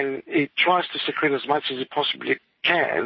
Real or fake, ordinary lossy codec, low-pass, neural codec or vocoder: real; MP3, 24 kbps; 7.2 kHz; none